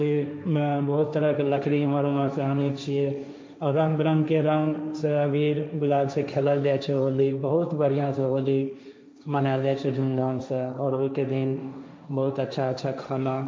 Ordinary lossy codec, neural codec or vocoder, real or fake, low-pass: none; codec, 16 kHz, 1.1 kbps, Voila-Tokenizer; fake; none